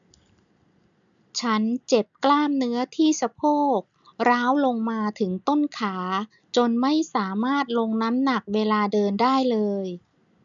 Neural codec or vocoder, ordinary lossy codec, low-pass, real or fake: none; none; 7.2 kHz; real